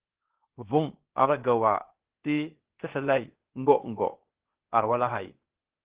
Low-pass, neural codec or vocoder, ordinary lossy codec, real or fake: 3.6 kHz; codec, 16 kHz, 0.8 kbps, ZipCodec; Opus, 16 kbps; fake